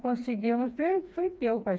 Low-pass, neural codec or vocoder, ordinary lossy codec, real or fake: none; codec, 16 kHz, 2 kbps, FreqCodec, smaller model; none; fake